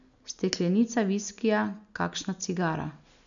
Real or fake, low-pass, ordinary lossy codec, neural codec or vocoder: real; 7.2 kHz; MP3, 96 kbps; none